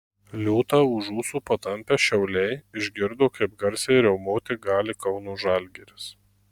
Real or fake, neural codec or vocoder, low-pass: real; none; 19.8 kHz